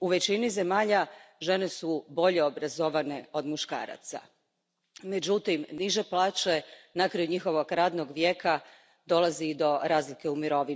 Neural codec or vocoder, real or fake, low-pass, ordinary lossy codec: none; real; none; none